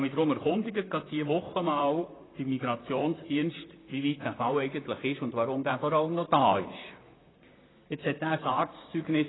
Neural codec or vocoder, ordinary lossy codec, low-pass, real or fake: vocoder, 44.1 kHz, 128 mel bands, Pupu-Vocoder; AAC, 16 kbps; 7.2 kHz; fake